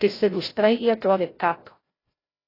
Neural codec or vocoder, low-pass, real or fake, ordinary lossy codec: codec, 16 kHz, 0.5 kbps, FreqCodec, larger model; 5.4 kHz; fake; AAC, 24 kbps